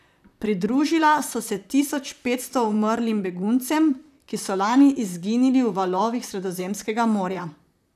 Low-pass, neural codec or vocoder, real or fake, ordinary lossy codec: 14.4 kHz; vocoder, 44.1 kHz, 128 mel bands, Pupu-Vocoder; fake; none